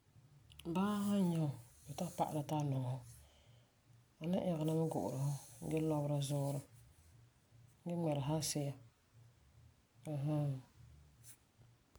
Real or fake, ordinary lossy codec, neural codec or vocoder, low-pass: real; none; none; none